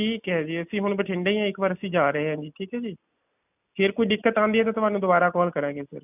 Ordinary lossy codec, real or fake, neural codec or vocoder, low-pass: none; real; none; 3.6 kHz